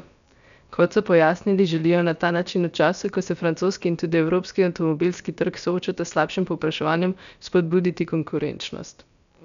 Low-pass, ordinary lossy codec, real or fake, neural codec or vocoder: 7.2 kHz; none; fake; codec, 16 kHz, about 1 kbps, DyCAST, with the encoder's durations